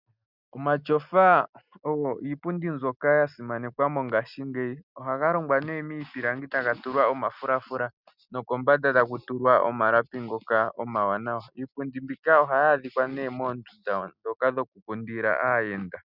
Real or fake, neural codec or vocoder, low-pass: real; none; 5.4 kHz